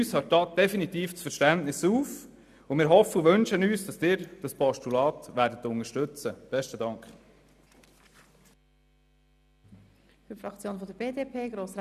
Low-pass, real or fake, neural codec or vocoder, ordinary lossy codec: 14.4 kHz; real; none; none